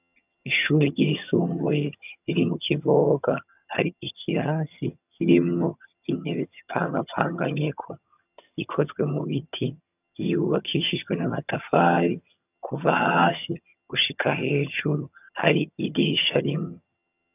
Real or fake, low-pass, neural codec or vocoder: fake; 3.6 kHz; vocoder, 22.05 kHz, 80 mel bands, HiFi-GAN